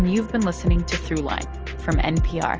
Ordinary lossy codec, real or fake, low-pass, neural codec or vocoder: Opus, 24 kbps; real; 7.2 kHz; none